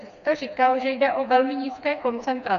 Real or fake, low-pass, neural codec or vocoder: fake; 7.2 kHz; codec, 16 kHz, 2 kbps, FreqCodec, smaller model